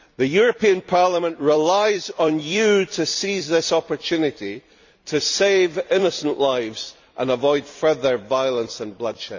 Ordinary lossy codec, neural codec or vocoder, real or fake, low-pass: none; vocoder, 44.1 kHz, 128 mel bands every 256 samples, BigVGAN v2; fake; 7.2 kHz